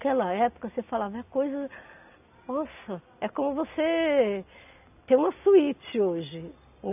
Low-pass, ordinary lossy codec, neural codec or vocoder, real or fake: 3.6 kHz; none; none; real